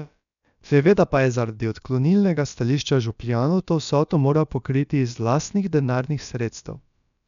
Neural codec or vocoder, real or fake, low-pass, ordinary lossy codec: codec, 16 kHz, about 1 kbps, DyCAST, with the encoder's durations; fake; 7.2 kHz; none